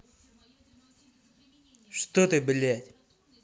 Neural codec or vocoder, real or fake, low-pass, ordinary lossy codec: none; real; none; none